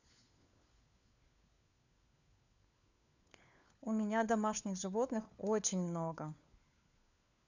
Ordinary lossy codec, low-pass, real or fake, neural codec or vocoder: none; 7.2 kHz; fake; codec, 16 kHz, 4 kbps, FunCodec, trained on LibriTTS, 50 frames a second